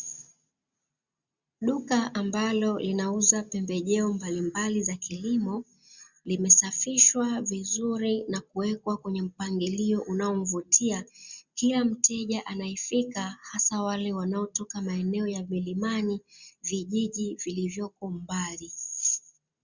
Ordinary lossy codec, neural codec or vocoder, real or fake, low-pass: Opus, 64 kbps; none; real; 7.2 kHz